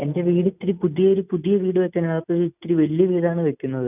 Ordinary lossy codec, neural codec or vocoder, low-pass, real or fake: none; none; 3.6 kHz; real